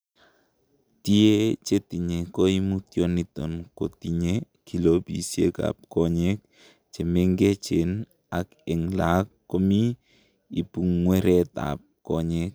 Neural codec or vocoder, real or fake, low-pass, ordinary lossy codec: none; real; none; none